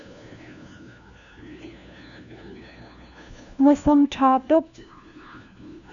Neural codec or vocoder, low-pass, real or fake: codec, 16 kHz, 1 kbps, FunCodec, trained on LibriTTS, 50 frames a second; 7.2 kHz; fake